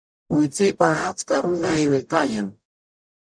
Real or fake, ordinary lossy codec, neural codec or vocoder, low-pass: fake; MP3, 96 kbps; codec, 44.1 kHz, 0.9 kbps, DAC; 9.9 kHz